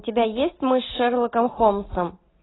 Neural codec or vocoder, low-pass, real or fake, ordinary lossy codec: none; 7.2 kHz; real; AAC, 16 kbps